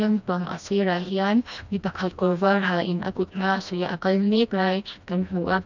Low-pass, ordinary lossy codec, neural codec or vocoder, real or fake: 7.2 kHz; none; codec, 16 kHz, 1 kbps, FreqCodec, smaller model; fake